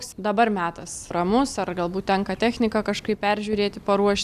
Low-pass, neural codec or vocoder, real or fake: 14.4 kHz; none; real